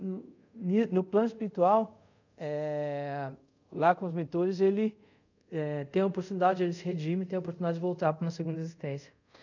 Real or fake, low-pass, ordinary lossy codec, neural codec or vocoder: fake; 7.2 kHz; none; codec, 24 kHz, 0.5 kbps, DualCodec